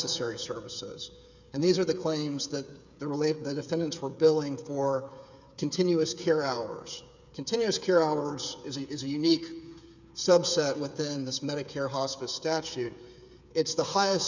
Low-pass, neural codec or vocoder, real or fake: 7.2 kHz; codec, 16 kHz, 8 kbps, FreqCodec, smaller model; fake